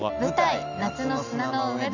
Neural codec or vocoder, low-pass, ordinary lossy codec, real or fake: none; 7.2 kHz; none; real